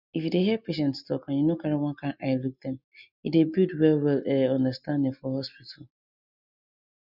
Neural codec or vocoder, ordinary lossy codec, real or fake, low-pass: none; none; real; 5.4 kHz